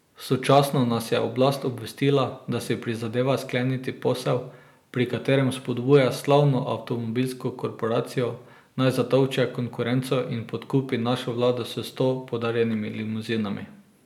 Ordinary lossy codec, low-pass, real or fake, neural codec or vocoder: none; 19.8 kHz; real; none